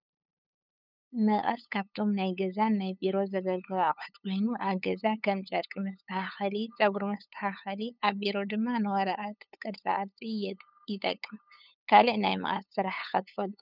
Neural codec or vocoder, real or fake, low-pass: codec, 16 kHz, 8 kbps, FunCodec, trained on LibriTTS, 25 frames a second; fake; 5.4 kHz